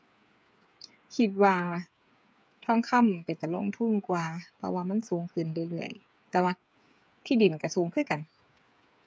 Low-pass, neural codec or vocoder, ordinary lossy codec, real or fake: none; codec, 16 kHz, 8 kbps, FreqCodec, smaller model; none; fake